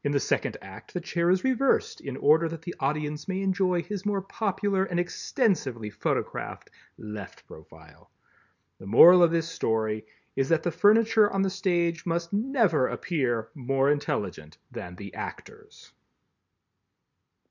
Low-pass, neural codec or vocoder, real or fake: 7.2 kHz; none; real